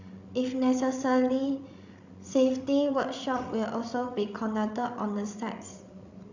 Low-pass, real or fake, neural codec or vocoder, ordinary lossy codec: 7.2 kHz; fake; codec, 16 kHz, 16 kbps, FreqCodec, larger model; none